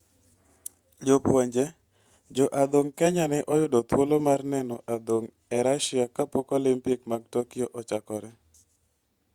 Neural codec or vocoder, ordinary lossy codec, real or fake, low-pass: vocoder, 48 kHz, 128 mel bands, Vocos; Opus, 64 kbps; fake; 19.8 kHz